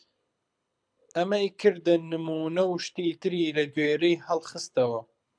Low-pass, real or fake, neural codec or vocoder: 9.9 kHz; fake; codec, 24 kHz, 6 kbps, HILCodec